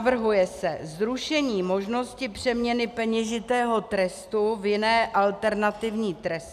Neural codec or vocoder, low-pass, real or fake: none; 14.4 kHz; real